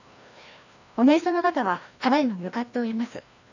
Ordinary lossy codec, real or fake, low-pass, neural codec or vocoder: none; fake; 7.2 kHz; codec, 16 kHz, 1 kbps, FreqCodec, larger model